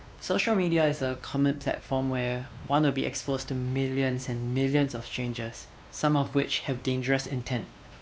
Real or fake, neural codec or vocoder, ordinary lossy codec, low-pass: fake; codec, 16 kHz, 2 kbps, X-Codec, WavLM features, trained on Multilingual LibriSpeech; none; none